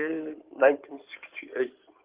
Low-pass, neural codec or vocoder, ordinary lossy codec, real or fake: 3.6 kHz; codec, 16 kHz in and 24 kHz out, 2.2 kbps, FireRedTTS-2 codec; Opus, 32 kbps; fake